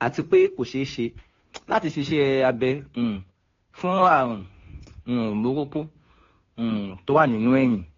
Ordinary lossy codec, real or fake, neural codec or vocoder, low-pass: AAC, 32 kbps; fake; codec, 16 kHz, 2 kbps, FunCodec, trained on Chinese and English, 25 frames a second; 7.2 kHz